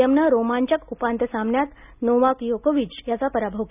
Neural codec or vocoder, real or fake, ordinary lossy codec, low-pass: none; real; none; 3.6 kHz